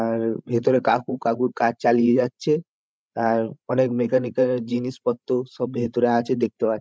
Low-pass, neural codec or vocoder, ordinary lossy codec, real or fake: none; codec, 16 kHz, 16 kbps, FreqCodec, larger model; none; fake